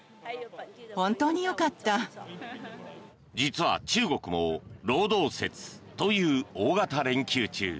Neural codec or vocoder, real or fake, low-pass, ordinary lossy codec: none; real; none; none